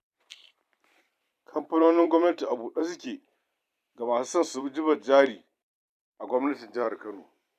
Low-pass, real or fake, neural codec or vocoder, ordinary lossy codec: 14.4 kHz; fake; vocoder, 44.1 kHz, 128 mel bands every 256 samples, BigVGAN v2; none